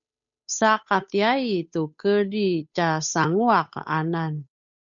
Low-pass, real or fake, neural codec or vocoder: 7.2 kHz; fake; codec, 16 kHz, 8 kbps, FunCodec, trained on Chinese and English, 25 frames a second